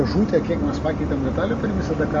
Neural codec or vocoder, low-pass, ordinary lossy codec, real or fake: none; 7.2 kHz; Opus, 32 kbps; real